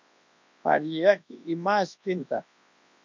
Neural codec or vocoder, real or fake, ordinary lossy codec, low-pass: codec, 24 kHz, 0.9 kbps, WavTokenizer, large speech release; fake; MP3, 48 kbps; 7.2 kHz